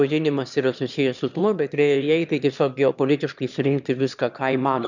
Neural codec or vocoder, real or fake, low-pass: autoencoder, 22.05 kHz, a latent of 192 numbers a frame, VITS, trained on one speaker; fake; 7.2 kHz